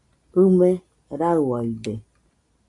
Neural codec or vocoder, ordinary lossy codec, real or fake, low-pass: none; AAC, 64 kbps; real; 10.8 kHz